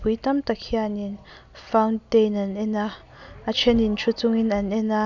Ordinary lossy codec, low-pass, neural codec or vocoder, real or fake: none; 7.2 kHz; none; real